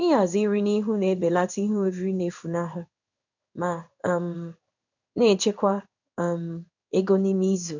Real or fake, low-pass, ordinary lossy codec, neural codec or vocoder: fake; 7.2 kHz; none; codec, 16 kHz in and 24 kHz out, 1 kbps, XY-Tokenizer